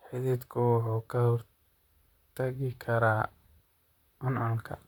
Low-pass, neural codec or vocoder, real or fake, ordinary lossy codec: 19.8 kHz; vocoder, 44.1 kHz, 128 mel bands, Pupu-Vocoder; fake; none